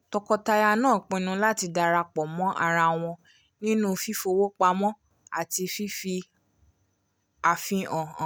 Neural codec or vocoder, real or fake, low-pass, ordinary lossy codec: none; real; none; none